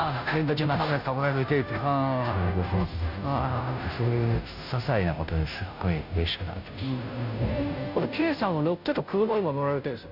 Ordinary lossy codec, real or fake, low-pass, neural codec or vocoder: none; fake; 5.4 kHz; codec, 16 kHz, 0.5 kbps, FunCodec, trained on Chinese and English, 25 frames a second